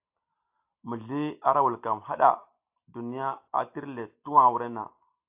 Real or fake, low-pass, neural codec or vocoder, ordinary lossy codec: real; 3.6 kHz; none; AAC, 32 kbps